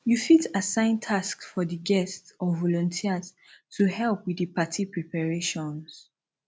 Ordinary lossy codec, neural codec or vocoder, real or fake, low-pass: none; none; real; none